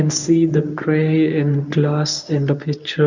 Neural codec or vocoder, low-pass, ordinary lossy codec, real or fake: codec, 24 kHz, 0.9 kbps, WavTokenizer, medium speech release version 1; 7.2 kHz; none; fake